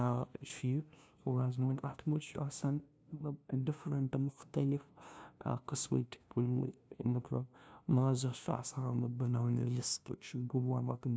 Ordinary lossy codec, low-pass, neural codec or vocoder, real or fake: none; none; codec, 16 kHz, 0.5 kbps, FunCodec, trained on LibriTTS, 25 frames a second; fake